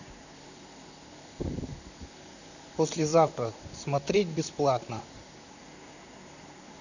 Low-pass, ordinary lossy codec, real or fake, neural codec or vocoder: 7.2 kHz; none; fake; vocoder, 44.1 kHz, 128 mel bands, Pupu-Vocoder